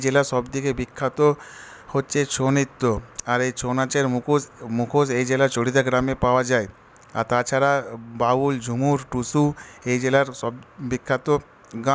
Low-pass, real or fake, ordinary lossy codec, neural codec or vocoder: none; real; none; none